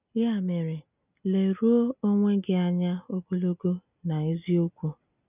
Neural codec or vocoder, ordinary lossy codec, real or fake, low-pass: none; none; real; 3.6 kHz